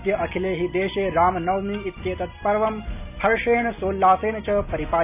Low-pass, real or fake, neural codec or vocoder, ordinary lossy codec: 3.6 kHz; real; none; none